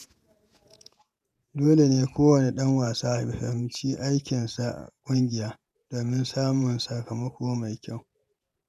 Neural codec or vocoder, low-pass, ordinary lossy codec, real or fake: vocoder, 48 kHz, 128 mel bands, Vocos; 14.4 kHz; none; fake